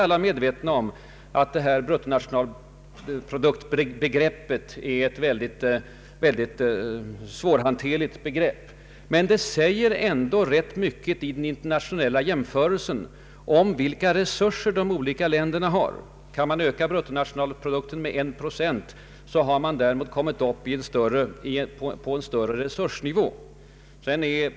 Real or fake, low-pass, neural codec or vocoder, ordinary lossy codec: real; none; none; none